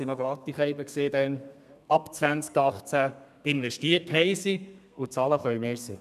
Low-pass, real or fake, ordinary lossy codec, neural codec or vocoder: 14.4 kHz; fake; none; codec, 32 kHz, 1.9 kbps, SNAC